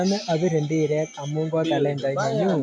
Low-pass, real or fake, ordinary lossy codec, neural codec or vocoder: none; real; none; none